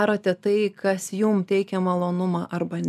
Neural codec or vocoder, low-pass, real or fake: none; 14.4 kHz; real